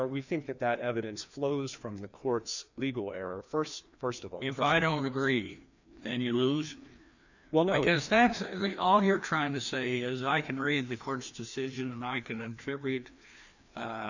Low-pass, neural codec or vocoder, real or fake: 7.2 kHz; codec, 16 kHz, 2 kbps, FreqCodec, larger model; fake